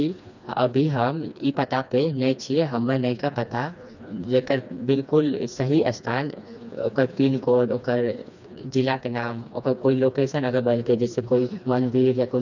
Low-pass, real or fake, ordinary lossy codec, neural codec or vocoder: 7.2 kHz; fake; none; codec, 16 kHz, 2 kbps, FreqCodec, smaller model